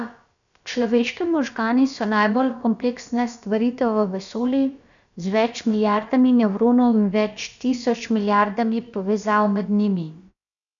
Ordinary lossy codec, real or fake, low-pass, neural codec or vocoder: none; fake; 7.2 kHz; codec, 16 kHz, about 1 kbps, DyCAST, with the encoder's durations